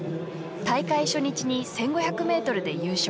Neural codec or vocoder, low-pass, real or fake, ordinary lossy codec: none; none; real; none